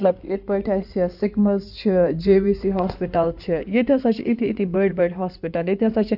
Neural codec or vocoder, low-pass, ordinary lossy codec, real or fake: codec, 16 kHz in and 24 kHz out, 2.2 kbps, FireRedTTS-2 codec; 5.4 kHz; none; fake